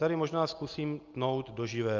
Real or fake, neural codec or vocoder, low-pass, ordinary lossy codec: real; none; 7.2 kHz; Opus, 24 kbps